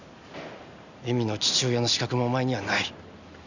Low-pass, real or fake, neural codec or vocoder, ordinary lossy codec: 7.2 kHz; real; none; none